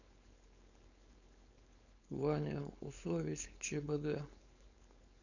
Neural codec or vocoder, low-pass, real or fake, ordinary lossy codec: codec, 16 kHz, 4.8 kbps, FACodec; 7.2 kHz; fake; none